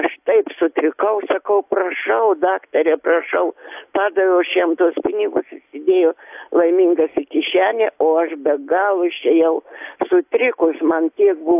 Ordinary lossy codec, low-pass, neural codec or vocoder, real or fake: AAC, 32 kbps; 3.6 kHz; none; real